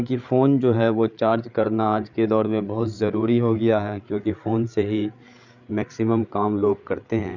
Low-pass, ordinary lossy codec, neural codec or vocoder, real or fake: 7.2 kHz; none; codec, 16 kHz, 8 kbps, FreqCodec, larger model; fake